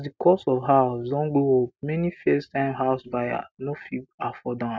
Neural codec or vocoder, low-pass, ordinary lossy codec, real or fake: none; none; none; real